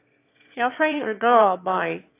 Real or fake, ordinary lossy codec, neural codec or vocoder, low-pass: fake; AAC, 24 kbps; autoencoder, 22.05 kHz, a latent of 192 numbers a frame, VITS, trained on one speaker; 3.6 kHz